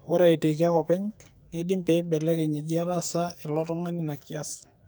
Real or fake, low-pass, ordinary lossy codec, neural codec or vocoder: fake; none; none; codec, 44.1 kHz, 2.6 kbps, SNAC